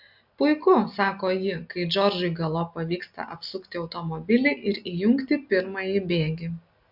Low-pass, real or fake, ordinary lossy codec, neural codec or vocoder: 5.4 kHz; real; AAC, 48 kbps; none